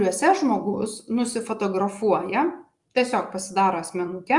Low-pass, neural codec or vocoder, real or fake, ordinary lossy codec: 10.8 kHz; none; real; Opus, 64 kbps